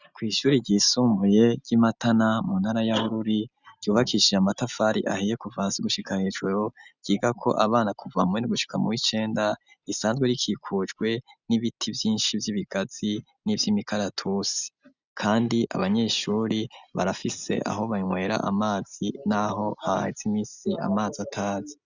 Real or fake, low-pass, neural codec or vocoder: real; 7.2 kHz; none